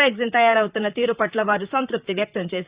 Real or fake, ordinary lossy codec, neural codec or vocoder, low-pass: fake; Opus, 64 kbps; vocoder, 44.1 kHz, 128 mel bands, Pupu-Vocoder; 3.6 kHz